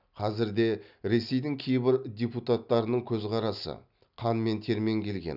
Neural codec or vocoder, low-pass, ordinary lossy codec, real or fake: none; 5.4 kHz; none; real